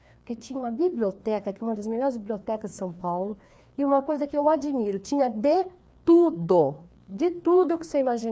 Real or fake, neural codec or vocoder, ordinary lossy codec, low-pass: fake; codec, 16 kHz, 2 kbps, FreqCodec, larger model; none; none